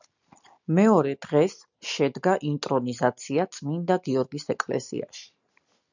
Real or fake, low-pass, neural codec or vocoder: real; 7.2 kHz; none